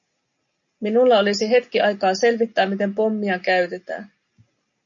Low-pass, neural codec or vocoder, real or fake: 7.2 kHz; none; real